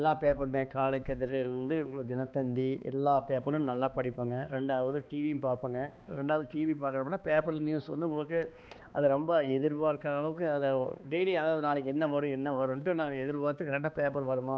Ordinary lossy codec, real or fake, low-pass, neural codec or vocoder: none; fake; none; codec, 16 kHz, 2 kbps, X-Codec, HuBERT features, trained on balanced general audio